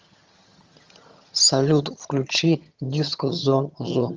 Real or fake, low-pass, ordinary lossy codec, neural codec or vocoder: fake; 7.2 kHz; Opus, 32 kbps; vocoder, 22.05 kHz, 80 mel bands, HiFi-GAN